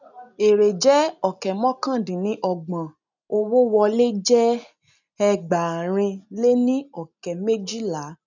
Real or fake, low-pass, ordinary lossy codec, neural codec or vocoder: real; 7.2 kHz; none; none